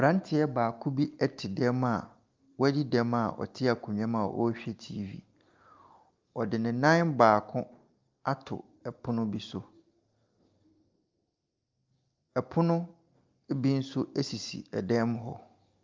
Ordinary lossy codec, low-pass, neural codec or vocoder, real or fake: Opus, 24 kbps; 7.2 kHz; none; real